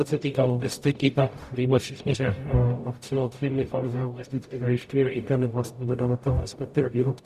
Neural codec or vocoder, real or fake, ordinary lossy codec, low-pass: codec, 44.1 kHz, 0.9 kbps, DAC; fake; Opus, 64 kbps; 14.4 kHz